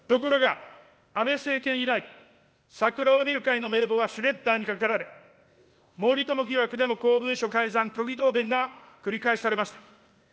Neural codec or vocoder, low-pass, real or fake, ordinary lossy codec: codec, 16 kHz, 0.8 kbps, ZipCodec; none; fake; none